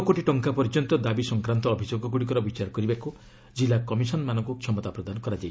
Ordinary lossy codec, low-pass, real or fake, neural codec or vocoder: none; none; real; none